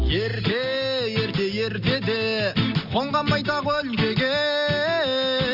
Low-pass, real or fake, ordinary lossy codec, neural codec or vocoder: 5.4 kHz; real; Opus, 64 kbps; none